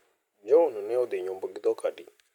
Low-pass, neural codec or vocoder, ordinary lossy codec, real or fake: 19.8 kHz; none; MP3, 96 kbps; real